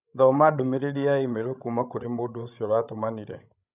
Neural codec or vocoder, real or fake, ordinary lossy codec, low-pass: codec, 16 kHz, 16 kbps, FreqCodec, larger model; fake; none; 3.6 kHz